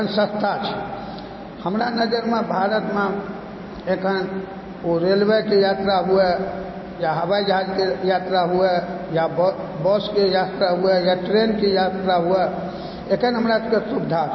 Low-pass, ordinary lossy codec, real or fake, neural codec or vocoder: 7.2 kHz; MP3, 24 kbps; real; none